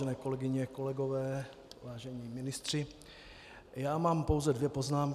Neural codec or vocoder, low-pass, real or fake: none; 14.4 kHz; real